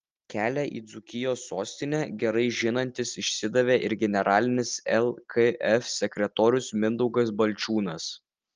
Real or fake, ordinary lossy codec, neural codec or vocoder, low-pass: real; Opus, 24 kbps; none; 7.2 kHz